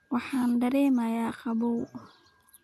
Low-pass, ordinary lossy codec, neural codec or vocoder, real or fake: 14.4 kHz; AAC, 64 kbps; none; real